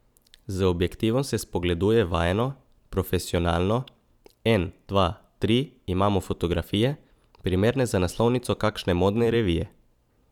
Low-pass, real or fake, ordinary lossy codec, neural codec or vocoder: 19.8 kHz; fake; none; vocoder, 44.1 kHz, 128 mel bands every 512 samples, BigVGAN v2